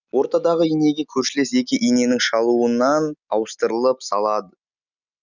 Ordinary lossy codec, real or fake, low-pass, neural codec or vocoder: none; real; 7.2 kHz; none